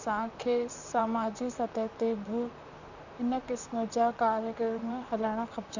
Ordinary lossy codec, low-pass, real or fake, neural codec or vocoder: none; 7.2 kHz; fake; vocoder, 44.1 kHz, 128 mel bands, Pupu-Vocoder